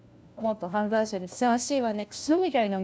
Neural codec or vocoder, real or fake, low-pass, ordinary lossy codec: codec, 16 kHz, 1 kbps, FunCodec, trained on LibriTTS, 50 frames a second; fake; none; none